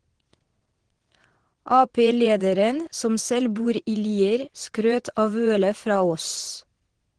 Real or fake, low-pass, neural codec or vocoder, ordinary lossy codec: fake; 9.9 kHz; vocoder, 22.05 kHz, 80 mel bands, WaveNeXt; Opus, 16 kbps